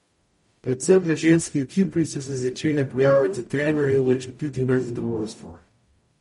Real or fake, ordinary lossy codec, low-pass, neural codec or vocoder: fake; MP3, 48 kbps; 19.8 kHz; codec, 44.1 kHz, 0.9 kbps, DAC